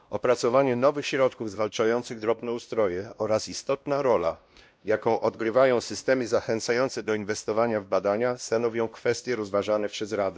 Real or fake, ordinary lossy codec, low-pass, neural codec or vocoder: fake; none; none; codec, 16 kHz, 1 kbps, X-Codec, WavLM features, trained on Multilingual LibriSpeech